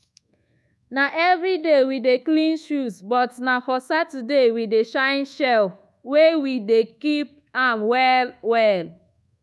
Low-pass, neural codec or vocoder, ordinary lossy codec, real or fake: none; codec, 24 kHz, 1.2 kbps, DualCodec; none; fake